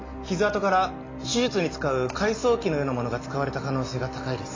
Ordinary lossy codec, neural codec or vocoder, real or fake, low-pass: AAC, 32 kbps; none; real; 7.2 kHz